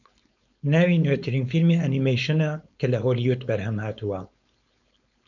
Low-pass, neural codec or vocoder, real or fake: 7.2 kHz; codec, 16 kHz, 4.8 kbps, FACodec; fake